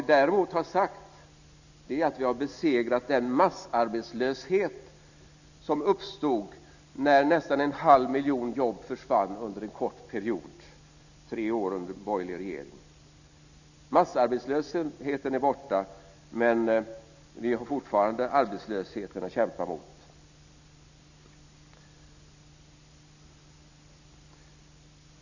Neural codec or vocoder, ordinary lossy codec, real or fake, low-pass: none; none; real; 7.2 kHz